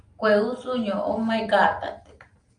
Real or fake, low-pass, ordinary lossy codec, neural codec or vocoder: real; 9.9 kHz; Opus, 32 kbps; none